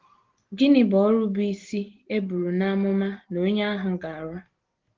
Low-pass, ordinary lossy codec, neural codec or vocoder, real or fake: 7.2 kHz; Opus, 16 kbps; none; real